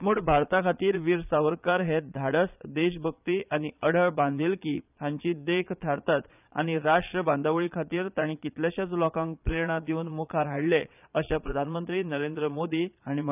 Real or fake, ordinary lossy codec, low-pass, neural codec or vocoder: fake; none; 3.6 kHz; vocoder, 22.05 kHz, 80 mel bands, Vocos